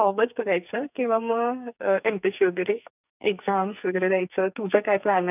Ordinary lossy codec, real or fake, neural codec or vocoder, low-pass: none; fake; codec, 44.1 kHz, 2.6 kbps, SNAC; 3.6 kHz